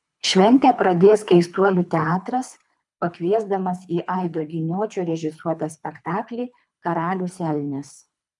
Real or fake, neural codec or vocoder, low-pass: fake; codec, 24 kHz, 3 kbps, HILCodec; 10.8 kHz